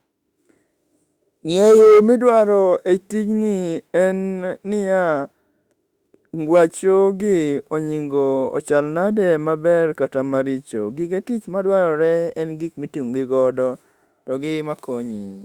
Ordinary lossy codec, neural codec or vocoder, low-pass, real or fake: Opus, 64 kbps; autoencoder, 48 kHz, 32 numbers a frame, DAC-VAE, trained on Japanese speech; 19.8 kHz; fake